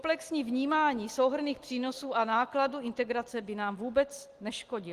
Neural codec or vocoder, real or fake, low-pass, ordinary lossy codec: none; real; 14.4 kHz; Opus, 24 kbps